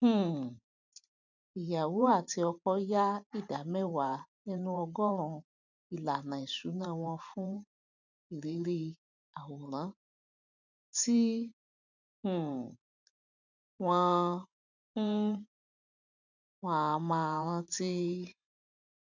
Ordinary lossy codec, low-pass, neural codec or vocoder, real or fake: none; 7.2 kHz; vocoder, 44.1 kHz, 128 mel bands every 512 samples, BigVGAN v2; fake